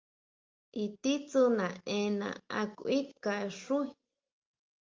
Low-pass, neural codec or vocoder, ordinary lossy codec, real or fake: 7.2 kHz; none; Opus, 32 kbps; real